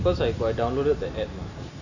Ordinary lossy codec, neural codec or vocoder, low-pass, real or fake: none; none; 7.2 kHz; real